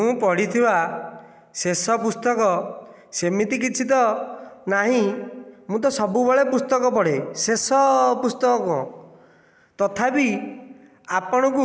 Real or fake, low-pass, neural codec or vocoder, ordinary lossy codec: real; none; none; none